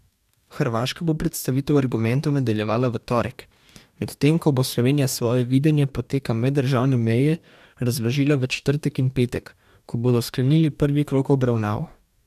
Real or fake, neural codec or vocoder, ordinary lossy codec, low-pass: fake; codec, 44.1 kHz, 2.6 kbps, DAC; none; 14.4 kHz